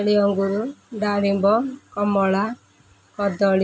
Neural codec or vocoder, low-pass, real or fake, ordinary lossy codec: none; none; real; none